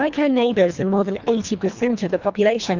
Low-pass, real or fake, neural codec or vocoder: 7.2 kHz; fake; codec, 24 kHz, 1.5 kbps, HILCodec